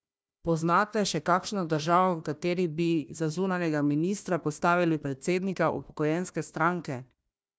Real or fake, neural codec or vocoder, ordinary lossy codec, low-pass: fake; codec, 16 kHz, 1 kbps, FunCodec, trained on Chinese and English, 50 frames a second; none; none